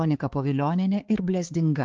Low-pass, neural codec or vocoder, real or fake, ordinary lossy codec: 7.2 kHz; codec, 16 kHz, 4 kbps, X-Codec, HuBERT features, trained on balanced general audio; fake; Opus, 16 kbps